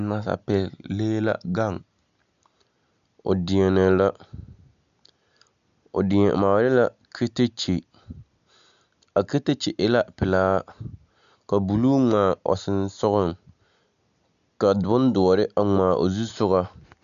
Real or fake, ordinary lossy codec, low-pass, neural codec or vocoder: real; MP3, 96 kbps; 7.2 kHz; none